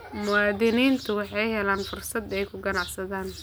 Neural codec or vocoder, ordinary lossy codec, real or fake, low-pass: none; none; real; none